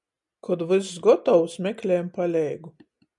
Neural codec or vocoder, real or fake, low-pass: none; real; 10.8 kHz